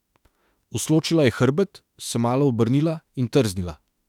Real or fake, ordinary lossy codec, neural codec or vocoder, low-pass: fake; none; autoencoder, 48 kHz, 32 numbers a frame, DAC-VAE, trained on Japanese speech; 19.8 kHz